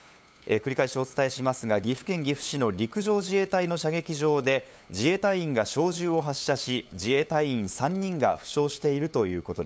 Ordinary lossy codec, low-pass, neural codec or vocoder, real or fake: none; none; codec, 16 kHz, 8 kbps, FunCodec, trained on LibriTTS, 25 frames a second; fake